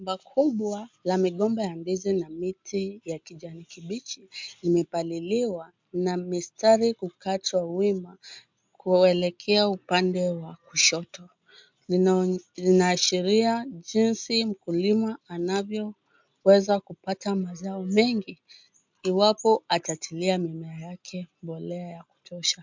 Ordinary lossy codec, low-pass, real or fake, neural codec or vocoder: MP3, 64 kbps; 7.2 kHz; real; none